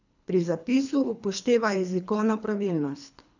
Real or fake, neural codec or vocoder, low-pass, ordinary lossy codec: fake; codec, 24 kHz, 3 kbps, HILCodec; 7.2 kHz; none